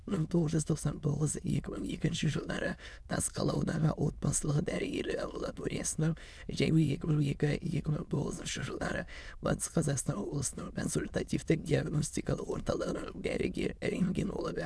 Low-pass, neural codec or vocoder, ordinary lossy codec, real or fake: none; autoencoder, 22.05 kHz, a latent of 192 numbers a frame, VITS, trained on many speakers; none; fake